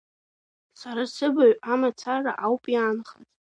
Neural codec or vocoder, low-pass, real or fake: none; 9.9 kHz; real